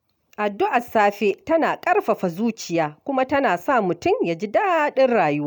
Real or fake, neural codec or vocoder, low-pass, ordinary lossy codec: real; none; none; none